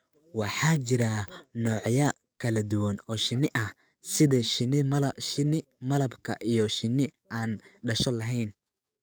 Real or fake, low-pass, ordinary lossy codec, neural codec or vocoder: fake; none; none; codec, 44.1 kHz, 7.8 kbps, DAC